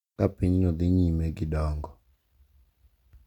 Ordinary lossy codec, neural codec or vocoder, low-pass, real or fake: none; none; 19.8 kHz; real